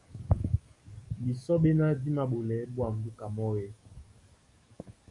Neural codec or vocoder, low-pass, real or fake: codec, 44.1 kHz, 7.8 kbps, DAC; 10.8 kHz; fake